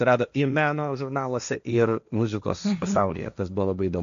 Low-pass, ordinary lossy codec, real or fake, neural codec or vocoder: 7.2 kHz; AAC, 96 kbps; fake; codec, 16 kHz, 1.1 kbps, Voila-Tokenizer